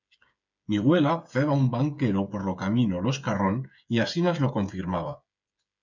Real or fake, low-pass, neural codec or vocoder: fake; 7.2 kHz; codec, 16 kHz, 8 kbps, FreqCodec, smaller model